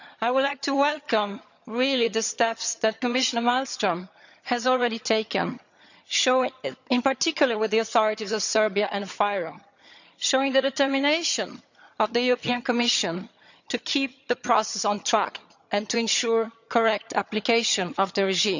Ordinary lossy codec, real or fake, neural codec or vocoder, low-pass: none; fake; vocoder, 22.05 kHz, 80 mel bands, HiFi-GAN; 7.2 kHz